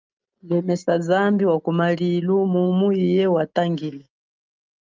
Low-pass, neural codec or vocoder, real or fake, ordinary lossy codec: 7.2 kHz; none; real; Opus, 32 kbps